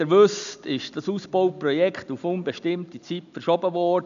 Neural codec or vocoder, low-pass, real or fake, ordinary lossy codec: none; 7.2 kHz; real; none